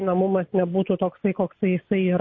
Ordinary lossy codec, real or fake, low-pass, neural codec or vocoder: MP3, 32 kbps; real; 7.2 kHz; none